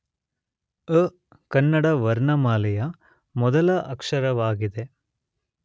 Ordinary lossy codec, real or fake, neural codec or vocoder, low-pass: none; real; none; none